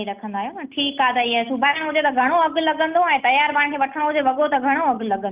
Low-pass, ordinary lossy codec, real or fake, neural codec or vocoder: 3.6 kHz; Opus, 32 kbps; real; none